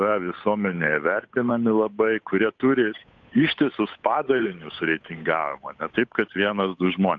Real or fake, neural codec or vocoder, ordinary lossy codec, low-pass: fake; codec, 16 kHz, 8 kbps, FunCodec, trained on Chinese and English, 25 frames a second; AAC, 48 kbps; 7.2 kHz